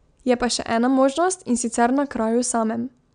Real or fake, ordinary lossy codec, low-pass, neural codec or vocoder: real; none; 9.9 kHz; none